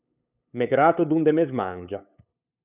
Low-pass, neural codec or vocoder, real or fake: 3.6 kHz; codec, 16 kHz, 8 kbps, FunCodec, trained on LibriTTS, 25 frames a second; fake